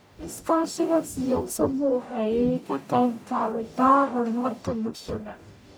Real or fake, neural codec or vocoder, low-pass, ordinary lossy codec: fake; codec, 44.1 kHz, 0.9 kbps, DAC; none; none